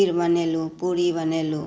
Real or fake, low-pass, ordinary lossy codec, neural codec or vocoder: real; none; none; none